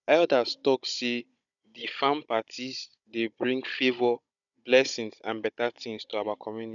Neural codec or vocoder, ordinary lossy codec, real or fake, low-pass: codec, 16 kHz, 16 kbps, FunCodec, trained on Chinese and English, 50 frames a second; none; fake; 7.2 kHz